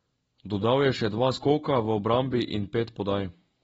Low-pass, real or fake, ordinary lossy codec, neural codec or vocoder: 19.8 kHz; real; AAC, 24 kbps; none